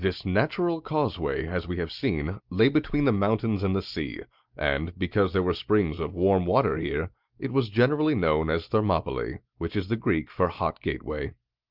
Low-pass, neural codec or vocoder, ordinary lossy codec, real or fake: 5.4 kHz; none; Opus, 24 kbps; real